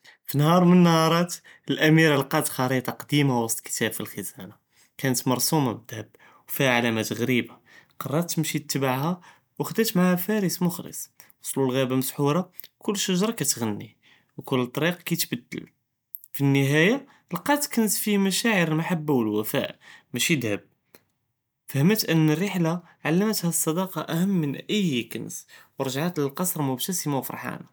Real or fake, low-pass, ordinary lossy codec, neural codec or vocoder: real; none; none; none